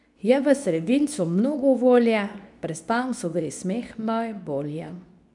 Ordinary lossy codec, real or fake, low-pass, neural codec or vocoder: none; fake; 10.8 kHz; codec, 24 kHz, 0.9 kbps, WavTokenizer, medium speech release version 2